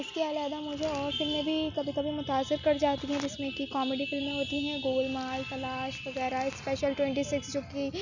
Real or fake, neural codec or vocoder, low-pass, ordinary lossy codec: real; none; 7.2 kHz; none